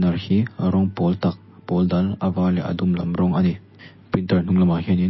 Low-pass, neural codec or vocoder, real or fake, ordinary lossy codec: 7.2 kHz; none; real; MP3, 24 kbps